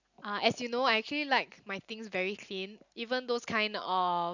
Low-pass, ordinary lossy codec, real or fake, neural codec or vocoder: 7.2 kHz; none; real; none